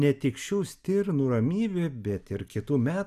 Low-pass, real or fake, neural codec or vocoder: 14.4 kHz; real; none